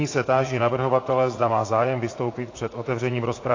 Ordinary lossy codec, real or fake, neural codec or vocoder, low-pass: AAC, 32 kbps; fake; vocoder, 44.1 kHz, 80 mel bands, Vocos; 7.2 kHz